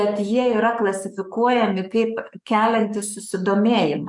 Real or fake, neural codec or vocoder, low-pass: fake; codec, 44.1 kHz, 7.8 kbps, DAC; 10.8 kHz